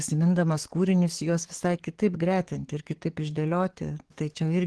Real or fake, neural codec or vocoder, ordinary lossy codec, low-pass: fake; codec, 44.1 kHz, 7.8 kbps, DAC; Opus, 16 kbps; 10.8 kHz